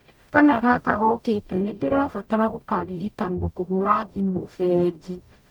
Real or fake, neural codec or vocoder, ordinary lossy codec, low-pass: fake; codec, 44.1 kHz, 0.9 kbps, DAC; none; 19.8 kHz